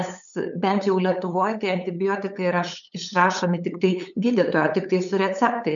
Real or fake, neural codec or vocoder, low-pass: fake; codec, 16 kHz, 8 kbps, FunCodec, trained on LibriTTS, 25 frames a second; 7.2 kHz